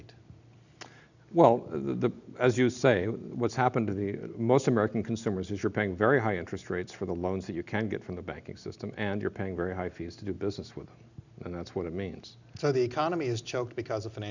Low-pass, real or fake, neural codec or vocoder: 7.2 kHz; real; none